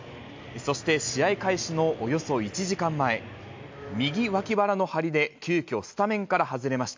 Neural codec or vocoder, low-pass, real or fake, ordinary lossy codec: none; 7.2 kHz; real; MP3, 64 kbps